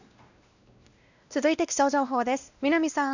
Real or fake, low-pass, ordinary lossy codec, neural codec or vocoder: fake; 7.2 kHz; none; codec, 16 kHz, 1 kbps, X-Codec, WavLM features, trained on Multilingual LibriSpeech